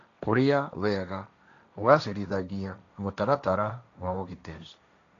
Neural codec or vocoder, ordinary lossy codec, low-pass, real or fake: codec, 16 kHz, 1.1 kbps, Voila-Tokenizer; none; 7.2 kHz; fake